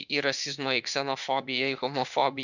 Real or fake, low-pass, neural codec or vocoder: fake; 7.2 kHz; autoencoder, 48 kHz, 32 numbers a frame, DAC-VAE, trained on Japanese speech